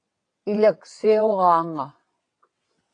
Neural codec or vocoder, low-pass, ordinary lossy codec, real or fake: vocoder, 22.05 kHz, 80 mel bands, WaveNeXt; 9.9 kHz; AAC, 48 kbps; fake